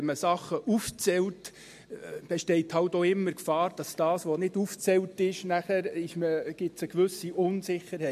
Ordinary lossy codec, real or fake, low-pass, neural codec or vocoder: MP3, 64 kbps; real; 14.4 kHz; none